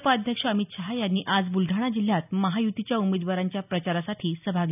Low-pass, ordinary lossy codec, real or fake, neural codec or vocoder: 3.6 kHz; none; real; none